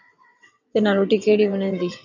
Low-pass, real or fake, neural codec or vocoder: 7.2 kHz; fake; vocoder, 22.05 kHz, 80 mel bands, WaveNeXt